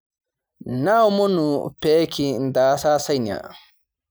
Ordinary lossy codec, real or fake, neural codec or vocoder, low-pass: none; real; none; none